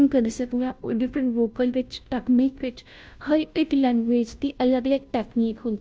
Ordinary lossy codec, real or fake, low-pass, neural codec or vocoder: none; fake; none; codec, 16 kHz, 0.5 kbps, FunCodec, trained on Chinese and English, 25 frames a second